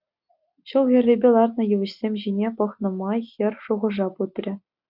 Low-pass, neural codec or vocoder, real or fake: 5.4 kHz; none; real